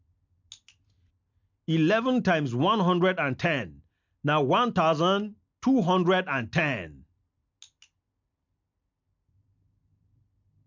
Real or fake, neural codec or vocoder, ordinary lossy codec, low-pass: real; none; MP3, 64 kbps; 7.2 kHz